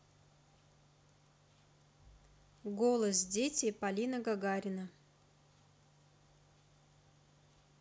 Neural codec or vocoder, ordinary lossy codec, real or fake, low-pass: none; none; real; none